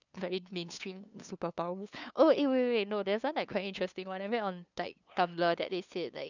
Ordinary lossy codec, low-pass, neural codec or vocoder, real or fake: none; 7.2 kHz; codec, 16 kHz, 2 kbps, FunCodec, trained on LibriTTS, 25 frames a second; fake